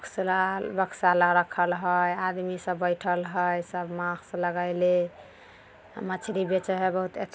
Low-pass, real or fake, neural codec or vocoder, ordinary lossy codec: none; real; none; none